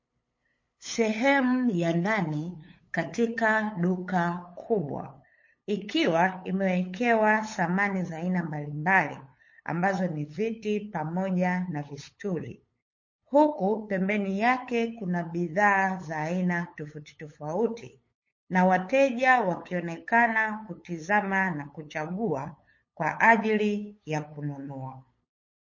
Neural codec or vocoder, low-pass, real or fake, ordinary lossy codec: codec, 16 kHz, 8 kbps, FunCodec, trained on LibriTTS, 25 frames a second; 7.2 kHz; fake; MP3, 32 kbps